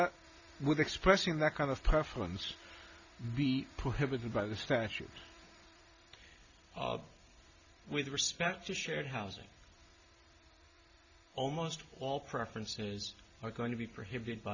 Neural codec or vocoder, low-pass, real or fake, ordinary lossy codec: none; 7.2 kHz; real; Opus, 64 kbps